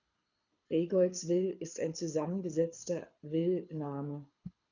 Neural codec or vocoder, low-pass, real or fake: codec, 24 kHz, 6 kbps, HILCodec; 7.2 kHz; fake